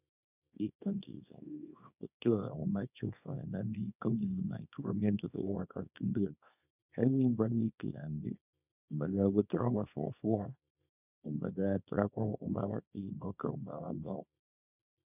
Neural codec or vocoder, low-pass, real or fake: codec, 24 kHz, 0.9 kbps, WavTokenizer, small release; 3.6 kHz; fake